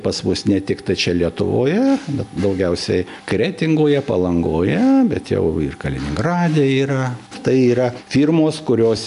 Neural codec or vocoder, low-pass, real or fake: none; 10.8 kHz; real